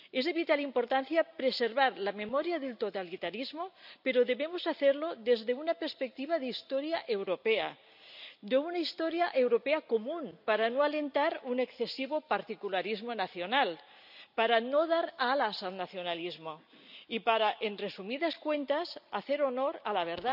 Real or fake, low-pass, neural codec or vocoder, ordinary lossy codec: real; 5.4 kHz; none; none